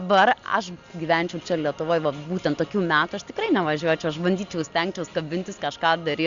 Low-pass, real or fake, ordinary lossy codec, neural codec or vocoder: 7.2 kHz; real; Opus, 64 kbps; none